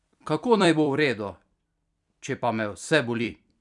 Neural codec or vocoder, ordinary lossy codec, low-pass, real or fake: vocoder, 44.1 kHz, 128 mel bands every 256 samples, BigVGAN v2; none; 10.8 kHz; fake